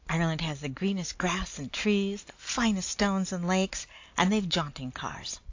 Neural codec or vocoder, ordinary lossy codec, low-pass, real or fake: none; AAC, 48 kbps; 7.2 kHz; real